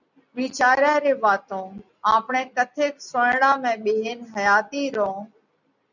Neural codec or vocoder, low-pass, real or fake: none; 7.2 kHz; real